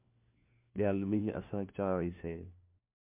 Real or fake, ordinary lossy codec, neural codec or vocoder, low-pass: fake; MP3, 32 kbps; codec, 16 kHz, 1 kbps, FunCodec, trained on LibriTTS, 50 frames a second; 3.6 kHz